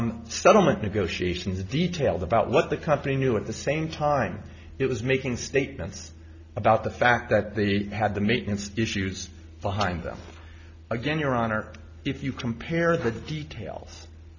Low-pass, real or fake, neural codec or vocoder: 7.2 kHz; real; none